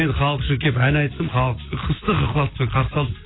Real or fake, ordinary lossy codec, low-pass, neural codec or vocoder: real; AAC, 16 kbps; 7.2 kHz; none